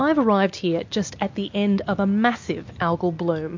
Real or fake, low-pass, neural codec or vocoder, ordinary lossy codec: real; 7.2 kHz; none; MP3, 48 kbps